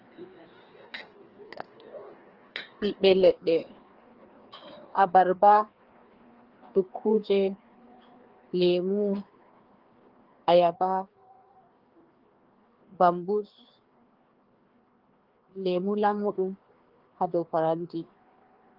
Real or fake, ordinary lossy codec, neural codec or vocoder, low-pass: fake; Opus, 16 kbps; codec, 16 kHz, 2 kbps, FreqCodec, larger model; 5.4 kHz